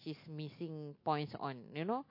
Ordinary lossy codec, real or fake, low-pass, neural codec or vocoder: MP3, 32 kbps; real; 5.4 kHz; none